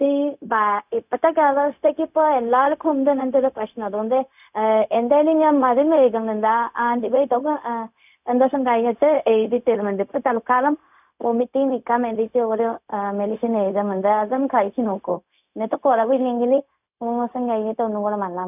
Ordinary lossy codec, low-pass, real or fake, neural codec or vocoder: none; 3.6 kHz; fake; codec, 16 kHz, 0.4 kbps, LongCat-Audio-Codec